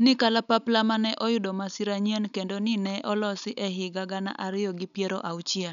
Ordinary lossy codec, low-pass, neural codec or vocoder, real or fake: none; 7.2 kHz; none; real